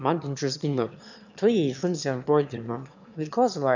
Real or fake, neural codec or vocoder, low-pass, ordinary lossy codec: fake; autoencoder, 22.05 kHz, a latent of 192 numbers a frame, VITS, trained on one speaker; 7.2 kHz; none